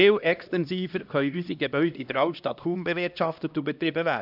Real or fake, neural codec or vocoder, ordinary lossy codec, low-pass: fake; codec, 16 kHz, 1 kbps, X-Codec, HuBERT features, trained on LibriSpeech; none; 5.4 kHz